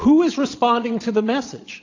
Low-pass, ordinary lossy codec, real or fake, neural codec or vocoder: 7.2 kHz; AAC, 48 kbps; fake; vocoder, 22.05 kHz, 80 mel bands, Vocos